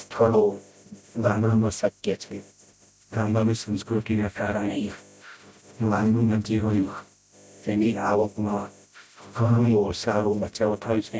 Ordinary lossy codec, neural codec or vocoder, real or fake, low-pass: none; codec, 16 kHz, 0.5 kbps, FreqCodec, smaller model; fake; none